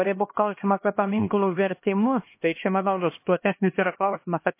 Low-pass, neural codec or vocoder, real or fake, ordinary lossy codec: 3.6 kHz; codec, 16 kHz, 1 kbps, X-Codec, HuBERT features, trained on LibriSpeech; fake; MP3, 24 kbps